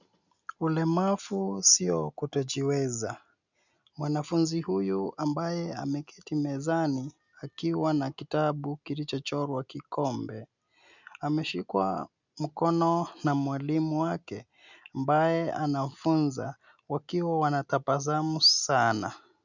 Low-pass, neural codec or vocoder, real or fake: 7.2 kHz; none; real